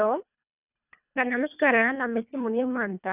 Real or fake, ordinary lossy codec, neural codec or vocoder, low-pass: fake; none; codec, 24 kHz, 1.5 kbps, HILCodec; 3.6 kHz